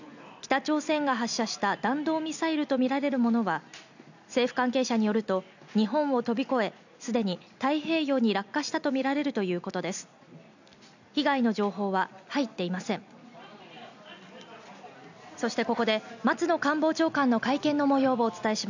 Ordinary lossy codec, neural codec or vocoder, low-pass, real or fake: none; none; 7.2 kHz; real